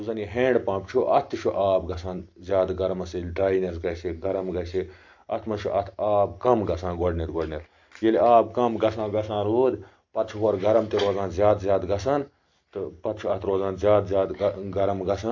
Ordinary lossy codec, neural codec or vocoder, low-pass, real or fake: AAC, 48 kbps; none; 7.2 kHz; real